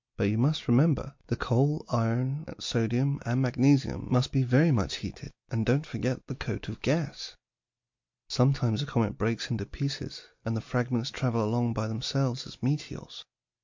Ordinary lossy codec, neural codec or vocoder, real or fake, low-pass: MP3, 48 kbps; none; real; 7.2 kHz